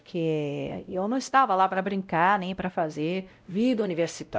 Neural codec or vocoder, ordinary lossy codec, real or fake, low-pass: codec, 16 kHz, 0.5 kbps, X-Codec, WavLM features, trained on Multilingual LibriSpeech; none; fake; none